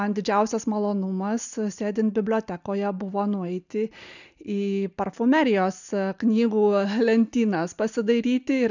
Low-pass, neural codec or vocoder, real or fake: 7.2 kHz; none; real